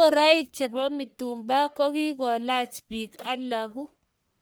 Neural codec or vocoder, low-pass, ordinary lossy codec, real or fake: codec, 44.1 kHz, 1.7 kbps, Pupu-Codec; none; none; fake